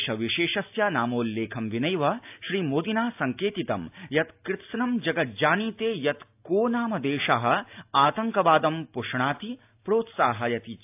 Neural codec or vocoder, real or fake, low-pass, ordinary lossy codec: none; real; 3.6 kHz; AAC, 32 kbps